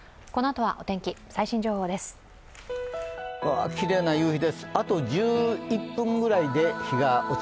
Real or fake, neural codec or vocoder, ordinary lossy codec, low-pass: real; none; none; none